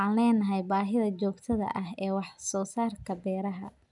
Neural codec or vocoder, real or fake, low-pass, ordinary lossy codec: none; real; 10.8 kHz; none